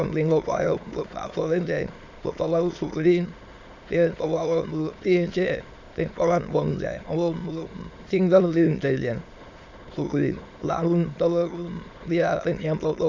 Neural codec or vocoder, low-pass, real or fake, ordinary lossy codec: autoencoder, 22.05 kHz, a latent of 192 numbers a frame, VITS, trained on many speakers; 7.2 kHz; fake; AAC, 48 kbps